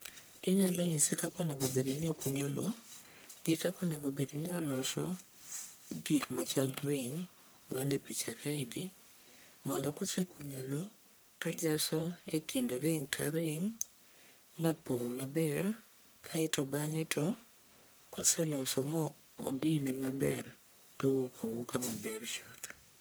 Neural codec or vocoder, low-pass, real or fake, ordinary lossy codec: codec, 44.1 kHz, 1.7 kbps, Pupu-Codec; none; fake; none